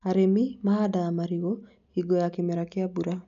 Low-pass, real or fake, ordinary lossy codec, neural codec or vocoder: 7.2 kHz; real; none; none